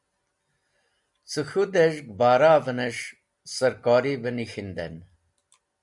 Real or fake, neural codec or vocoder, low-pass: real; none; 10.8 kHz